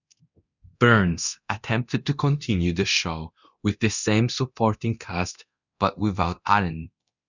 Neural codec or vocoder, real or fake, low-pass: codec, 24 kHz, 0.9 kbps, DualCodec; fake; 7.2 kHz